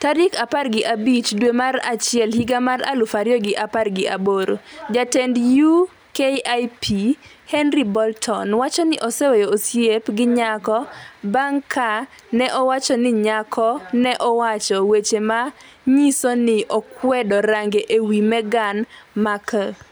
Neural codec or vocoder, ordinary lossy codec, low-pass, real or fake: none; none; none; real